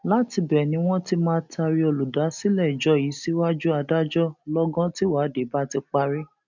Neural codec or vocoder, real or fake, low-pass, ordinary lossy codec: none; real; 7.2 kHz; none